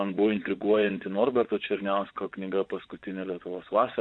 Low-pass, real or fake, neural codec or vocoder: 9.9 kHz; fake; vocoder, 24 kHz, 100 mel bands, Vocos